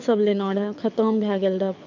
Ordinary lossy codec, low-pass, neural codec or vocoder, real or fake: none; 7.2 kHz; codec, 24 kHz, 6 kbps, HILCodec; fake